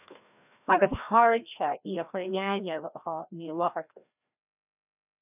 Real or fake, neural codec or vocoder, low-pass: fake; codec, 16 kHz, 1 kbps, FreqCodec, larger model; 3.6 kHz